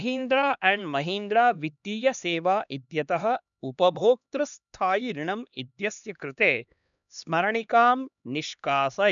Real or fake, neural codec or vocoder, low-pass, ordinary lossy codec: fake; codec, 16 kHz, 2 kbps, X-Codec, HuBERT features, trained on LibriSpeech; 7.2 kHz; none